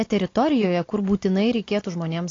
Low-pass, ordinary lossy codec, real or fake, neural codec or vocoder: 7.2 kHz; AAC, 32 kbps; real; none